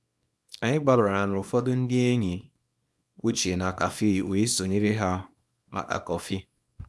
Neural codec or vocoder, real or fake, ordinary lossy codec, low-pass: codec, 24 kHz, 0.9 kbps, WavTokenizer, small release; fake; none; none